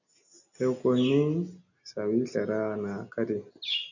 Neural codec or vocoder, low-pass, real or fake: none; 7.2 kHz; real